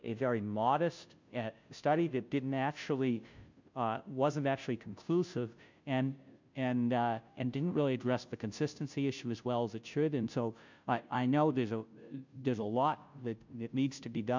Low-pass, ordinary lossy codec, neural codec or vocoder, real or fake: 7.2 kHz; MP3, 64 kbps; codec, 16 kHz, 0.5 kbps, FunCodec, trained on Chinese and English, 25 frames a second; fake